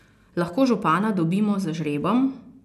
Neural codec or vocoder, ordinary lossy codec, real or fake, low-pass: none; none; real; 14.4 kHz